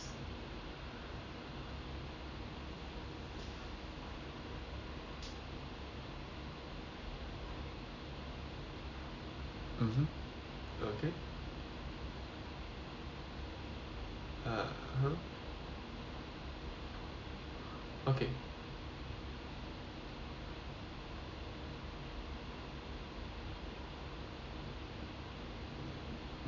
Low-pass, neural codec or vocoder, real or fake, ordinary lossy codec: 7.2 kHz; none; real; none